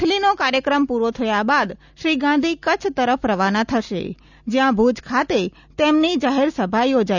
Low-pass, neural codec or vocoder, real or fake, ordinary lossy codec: 7.2 kHz; none; real; none